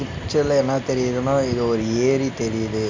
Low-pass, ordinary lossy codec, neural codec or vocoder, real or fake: 7.2 kHz; MP3, 64 kbps; none; real